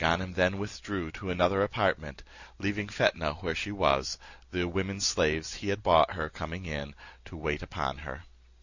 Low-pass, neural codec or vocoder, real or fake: 7.2 kHz; none; real